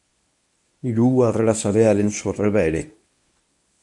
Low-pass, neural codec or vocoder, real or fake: 10.8 kHz; codec, 24 kHz, 0.9 kbps, WavTokenizer, medium speech release version 1; fake